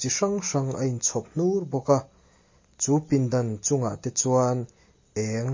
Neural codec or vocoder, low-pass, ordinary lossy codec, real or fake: none; 7.2 kHz; MP3, 32 kbps; real